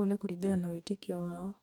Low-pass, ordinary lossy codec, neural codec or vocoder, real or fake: 19.8 kHz; none; codec, 44.1 kHz, 2.6 kbps, DAC; fake